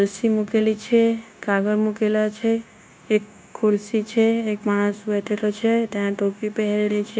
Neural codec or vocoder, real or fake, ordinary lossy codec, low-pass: codec, 16 kHz, 0.9 kbps, LongCat-Audio-Codec; fake; none; none